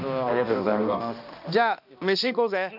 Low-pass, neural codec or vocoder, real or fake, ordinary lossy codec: 5.4 kHz; codec, 16 kHz, 1 kbps, X-Codec, HuBERT features, trained on balanced general audio; fake; none